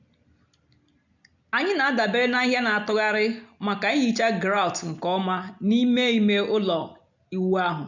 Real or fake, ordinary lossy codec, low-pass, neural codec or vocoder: real; none; 7.2 kHz; none